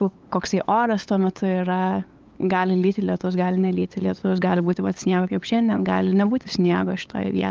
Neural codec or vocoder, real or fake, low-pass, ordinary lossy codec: codec, 16 kHz, 8 kbps, FunCodec, trained on LibriTTS, 25 frames a second; fake; 7.2 kHz; Opus, 24 kbps